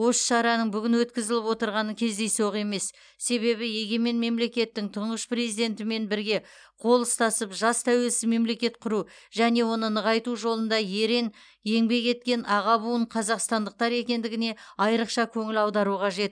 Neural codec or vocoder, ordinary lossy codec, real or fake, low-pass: none; none; real; 9.9 kHz